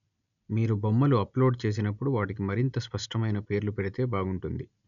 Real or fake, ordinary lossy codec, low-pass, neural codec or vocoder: real; none; 7.2 kHz; none